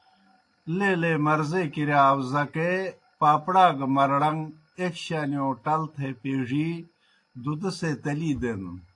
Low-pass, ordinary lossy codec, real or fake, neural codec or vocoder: 10.8 kHz; AAC, 48 kbps; real; none